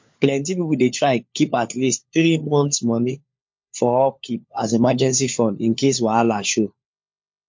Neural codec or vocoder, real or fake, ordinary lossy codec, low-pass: codec, 16 kHz, 4 kbps, FunCodec, trained on LibriTTS, 50 frames a second; fake; MP3, 48 kbps; 7.2 kHz